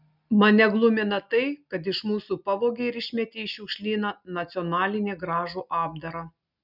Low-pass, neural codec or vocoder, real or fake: 5.4 kHz; none; real